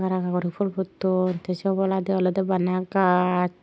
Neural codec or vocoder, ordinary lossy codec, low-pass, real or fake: none; none; none; real